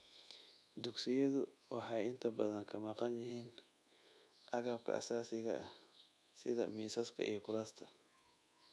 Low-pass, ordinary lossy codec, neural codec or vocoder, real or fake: none; none; codec, 24 kHz, 1.2 kbps, DualCodec; fake